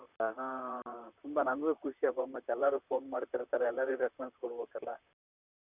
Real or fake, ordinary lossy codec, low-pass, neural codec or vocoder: fake; none; 3.6 kHz; vocoder, 44.1 kHz, 128 mel bands, Pupu-Vocoder